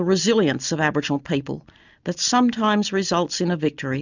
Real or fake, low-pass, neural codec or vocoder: real; 7.2 kHz; none